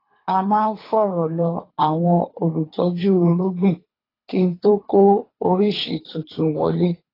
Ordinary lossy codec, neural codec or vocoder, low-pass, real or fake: AAC, 24 kbps; codec, 24 kHz, 3 kbps, HILCodec; 5.4 kHz; fake